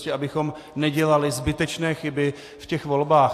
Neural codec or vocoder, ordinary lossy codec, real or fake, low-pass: none; AAC, 64 kbps; real; 14.4 kHz